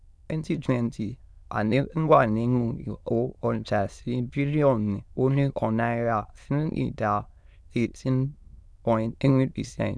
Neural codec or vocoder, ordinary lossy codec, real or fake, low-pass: autoencoder, 22.05 kHz, a latent of 192 numbers a frame, VITS, trained on many speakers; none; fake; none